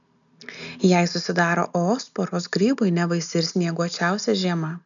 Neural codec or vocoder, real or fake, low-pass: none; real; 7.2 kHz